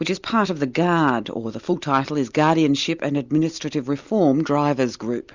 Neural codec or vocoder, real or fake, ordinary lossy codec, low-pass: none; real; Opus, 64 kbps; 7.2 kHz